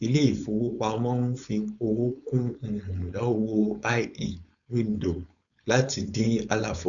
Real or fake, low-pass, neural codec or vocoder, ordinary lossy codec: fake; 7.2 kHz; codec, 16 kHz, 4.8 kbps, FACodec; none